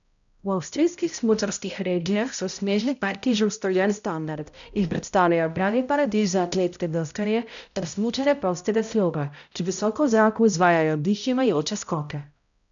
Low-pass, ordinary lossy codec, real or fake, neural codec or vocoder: 7.2 kHz; none; fake; codec, 16 kHz, 0.5 kbps, X-Codec, HuBERT features, trained on balanced general audio